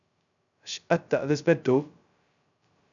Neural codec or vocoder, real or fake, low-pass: codec, 16 kHz, 0.2 kbps, FocalCodec; fake; 7.2 kHz